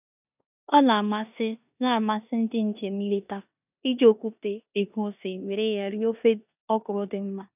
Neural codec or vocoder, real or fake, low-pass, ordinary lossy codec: codec, 16 kHz in and 24 kHz out, 0.9 kbps, LongCat-Audio-Codec, four codebook decoder; fake; 3.6 kHz; none